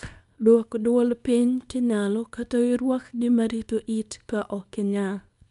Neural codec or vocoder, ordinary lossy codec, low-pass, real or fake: codec, 24 kHz, 0.9 kbps, WavTokenizer, small release; none; 10.8 kHz; fake